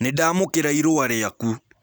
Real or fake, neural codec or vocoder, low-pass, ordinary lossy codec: real; none; none; none